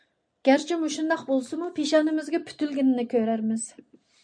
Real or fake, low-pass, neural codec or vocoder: real; 9.9 kHz; none